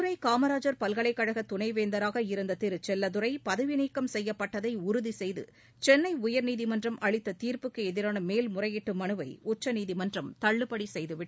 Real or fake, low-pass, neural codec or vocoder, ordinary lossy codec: real; none; none; none